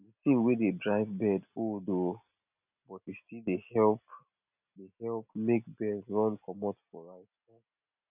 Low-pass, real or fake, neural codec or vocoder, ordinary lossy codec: 3.6 kHz; real; none; none